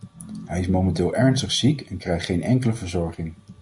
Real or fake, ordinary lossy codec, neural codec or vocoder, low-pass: real; Opus, 64 kbps; none; 10.8 kHz